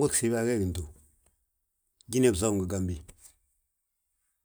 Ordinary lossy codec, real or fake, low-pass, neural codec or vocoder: none; real; none; none